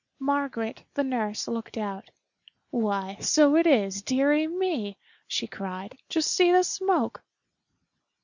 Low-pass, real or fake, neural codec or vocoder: 7.2 kHz; real; none